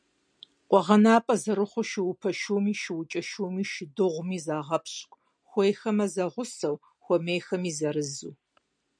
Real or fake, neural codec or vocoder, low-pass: real; none; 9.9 kHz